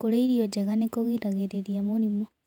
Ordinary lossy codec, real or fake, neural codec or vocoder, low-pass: none; fake; vocoder, 48 kHz, 128 mel bands, Vocos; 19.8 kHz